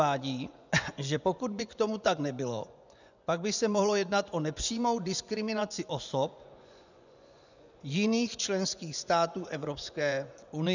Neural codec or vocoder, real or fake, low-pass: vocoder, 24 kHz, 100 mel bands, Vocos; fake; 7.2 kHz